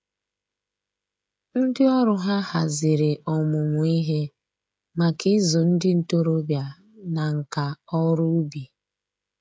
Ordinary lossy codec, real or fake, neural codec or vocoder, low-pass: none; fake; codec, 16 kHz, 16 kbps, FreqCodec, smaller model; none